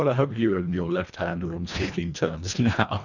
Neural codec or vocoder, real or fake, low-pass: codec, 24 kHz, 1.5 kbps, HILCodec; fake; 7.2 kHz